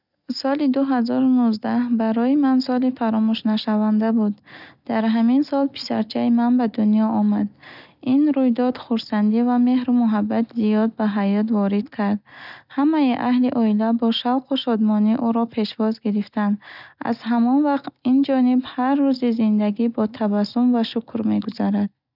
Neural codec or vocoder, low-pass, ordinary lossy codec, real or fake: none; 5.4 kHz; none; real